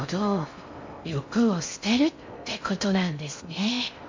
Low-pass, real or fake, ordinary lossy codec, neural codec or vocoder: 7.2 kHz; fake; MP3, 48 kbps; codec, 16 kHz in and 24 kHz out, 0.6 kbps, FocalCodec, streaming, 4096 codes